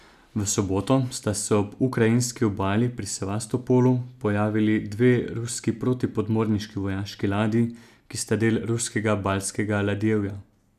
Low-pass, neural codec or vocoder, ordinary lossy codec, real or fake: 14.4 kHz; none; none; real